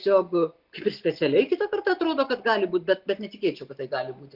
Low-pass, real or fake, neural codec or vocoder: 5.4 kHz; real; none